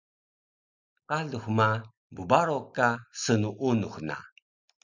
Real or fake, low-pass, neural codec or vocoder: real; 7.2 kHz; none